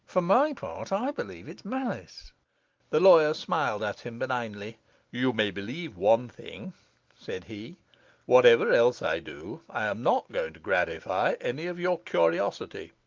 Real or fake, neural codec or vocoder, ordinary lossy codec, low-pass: real; none; Opus, 32 kbps; 7.2 kHz